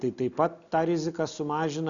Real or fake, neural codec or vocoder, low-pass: real; none; 7.2 kHz